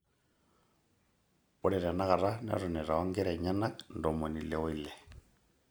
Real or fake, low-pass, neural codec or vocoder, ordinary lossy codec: real; none; none; none